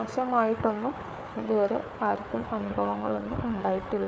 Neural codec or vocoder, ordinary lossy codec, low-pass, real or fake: codec, 16 kHz, 4 kbps, FunCodec, trained on Chinese and English, 50 frames a second; none; none; fake